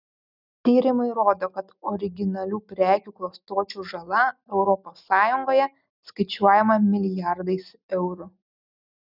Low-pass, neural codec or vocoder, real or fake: 5.4 kHz; none; real